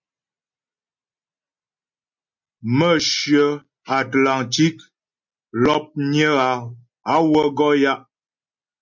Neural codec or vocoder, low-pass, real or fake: none; 7.2 kHz; real